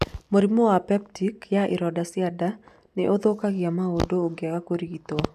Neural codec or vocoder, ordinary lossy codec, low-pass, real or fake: none; none; 14.4 kHz; real